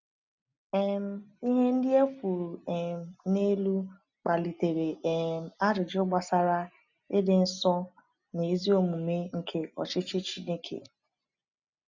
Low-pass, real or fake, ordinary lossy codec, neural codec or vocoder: 7.2 kHz; real; none; none